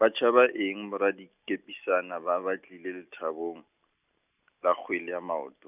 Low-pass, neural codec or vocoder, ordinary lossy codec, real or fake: 3.6 kHz; none; none; real